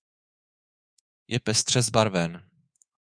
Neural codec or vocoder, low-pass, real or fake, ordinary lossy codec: codec, 24 kHz, 3.1 kbps, DualCodec; 9.9 kHz; fake; Opus, 64 kbps